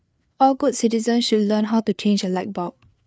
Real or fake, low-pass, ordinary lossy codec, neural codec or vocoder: fake; none; none; codec, 16 kHz, 4 kbps, FreqCodec, larger model